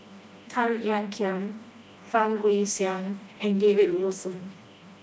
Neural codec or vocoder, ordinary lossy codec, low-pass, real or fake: codec, 16 kHz, 1 kbps, FreqCodec, smaller model; none; none; fake